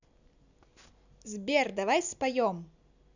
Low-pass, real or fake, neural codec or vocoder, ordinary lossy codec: 7.2 kHz; real; none; none